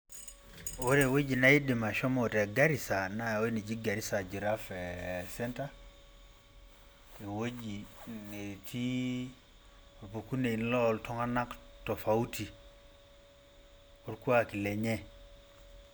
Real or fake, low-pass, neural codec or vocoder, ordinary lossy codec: real; none; none; none